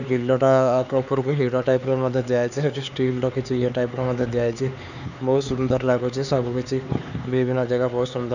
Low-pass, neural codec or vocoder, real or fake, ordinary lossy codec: 7.2 kHz; codec, 16 kHz, 4 kbps, X-Codec, HuBERT features, trained on LibriSpeech; fake; none